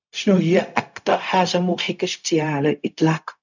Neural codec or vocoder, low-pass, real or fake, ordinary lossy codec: codec, 16 kHz, 0.4 kbps, LongCat-Audio-Codec; 7.2 kHz; fake; none